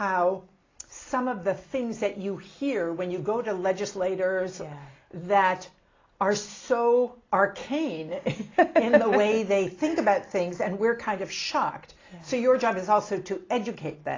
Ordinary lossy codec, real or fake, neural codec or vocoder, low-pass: AAC, 32 kbps; real; none; 7.2 kHz